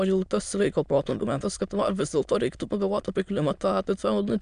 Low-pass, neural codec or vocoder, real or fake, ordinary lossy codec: 9.9 kHz; autoencoder, 22.05 kHz, a latent of 192 numbers a frame, VITS, trained on many speakers; fake; MP3, 96 kbps